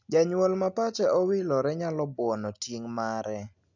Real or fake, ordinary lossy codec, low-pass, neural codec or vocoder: real; none; 7.2 kHz; none